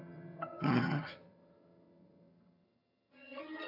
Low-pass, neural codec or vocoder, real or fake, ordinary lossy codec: 5.4 kHz; vocoder, 22.05 kHz, 80 mel bands, HiFi-GAN; fake; none